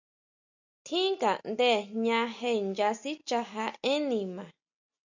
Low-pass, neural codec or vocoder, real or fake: 7.2 kHz; none; real